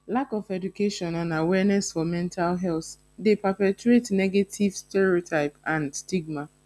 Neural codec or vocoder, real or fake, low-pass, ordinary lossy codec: none; real; none; none